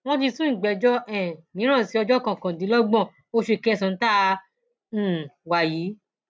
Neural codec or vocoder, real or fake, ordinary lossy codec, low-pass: none; real; none; none